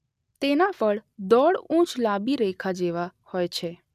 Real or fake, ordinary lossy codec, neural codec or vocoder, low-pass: real; none; none; 14.4 kHz